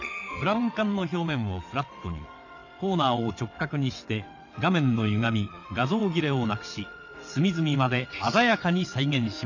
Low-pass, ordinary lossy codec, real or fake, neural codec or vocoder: 7.2 kHz; none; fake; vocoder, 22.05 kHz, 80 mel bands, WaveNeXt